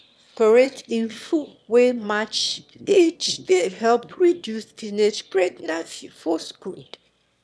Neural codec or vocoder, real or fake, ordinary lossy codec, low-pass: autoencoder, 22.05 kHz, a latent of 192 numbers a frame, VITS, trained on one speaker; fake; none; none